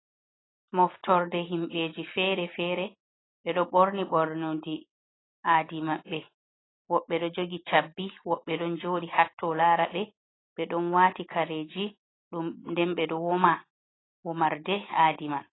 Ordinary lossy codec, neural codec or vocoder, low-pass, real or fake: AAC, 16 kbps; none; 7.2 kHz; real